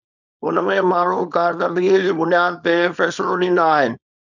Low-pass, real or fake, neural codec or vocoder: 7.2 kHz; fake; codec, 24 kHz, 0.9 kbps, WavTokenizer, small release